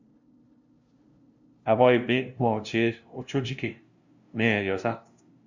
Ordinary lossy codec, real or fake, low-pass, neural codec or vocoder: Opus, 64 kbps; fake; 7.2 kHz; codec, 16 kHz, 0.5 kbps, FunCodec, trained on LibriTTS, 25 frames a second